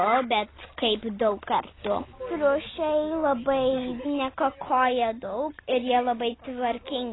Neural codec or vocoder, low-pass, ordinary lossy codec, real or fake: none; 7.2 kHz; AAC, 16 kbps; real